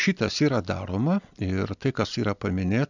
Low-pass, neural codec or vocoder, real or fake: 7.2 kHz; none; real